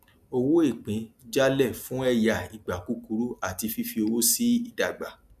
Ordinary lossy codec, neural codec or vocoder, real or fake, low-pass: none; none; real; 14.4 kHz